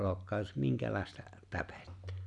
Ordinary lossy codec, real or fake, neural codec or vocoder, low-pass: none; real; none; 10.8 kHz